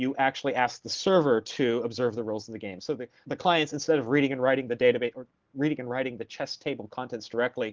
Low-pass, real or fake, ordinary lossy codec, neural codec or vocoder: 7.2 kHz; real; Opus, 16 kbps; none